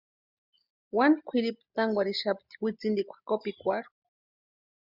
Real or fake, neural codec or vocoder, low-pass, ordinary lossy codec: real; none; 5.4 kHz; Opus, 64 kbps